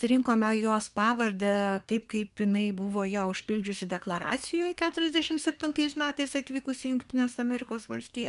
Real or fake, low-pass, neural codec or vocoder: fake; 10.8 kHz; codec, 24 kHz, 1 kbps, SNAC